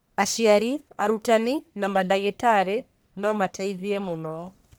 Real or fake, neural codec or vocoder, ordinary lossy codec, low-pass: fake; codec, 44.1 kHz, 1.7 kbps, Pupu-Codec; none; none